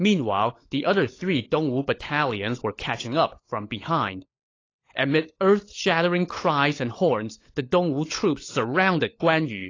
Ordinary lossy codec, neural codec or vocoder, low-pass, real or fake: AAC, 32 kbps; codec, 16 kHz, 16 kbps, FunCodec, trained on LibriTTS, 50 frames a second; 7.2 kHz; fake